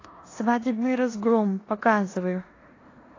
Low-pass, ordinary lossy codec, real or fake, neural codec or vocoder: 7.2 kHz; AAC, 32 kbps; fake; codec, 16 kHz in and 24 kHz out, 0.9 kbps, LongCat-Audio-Codec, four codebook decoder